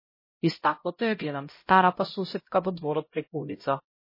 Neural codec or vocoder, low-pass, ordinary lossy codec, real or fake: codec, 16 kHz, 0.5 kbps, X-Codec, HuBERT features, trained on LibriSpeech; 5.4 kHz; MP3, 24 kbps; fake